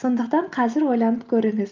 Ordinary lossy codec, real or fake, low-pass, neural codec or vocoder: Opus, 24 kbps; real; 7.2 kHz; none